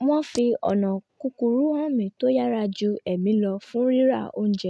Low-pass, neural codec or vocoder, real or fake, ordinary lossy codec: none; none; real; none